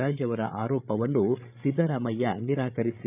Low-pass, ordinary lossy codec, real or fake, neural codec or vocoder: 3.6 kHz; none; fake; codec, 16 kHz, 8 kbps, FreqCodec, larger model